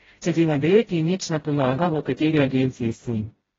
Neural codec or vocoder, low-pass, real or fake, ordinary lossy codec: codec, 16 kHz, 0.5 kbps, FreqCodec, smaller model; 7.2 kHz; fake; AAC, 24 kbps